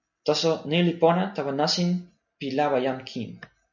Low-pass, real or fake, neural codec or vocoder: 7.2 kHz; real; none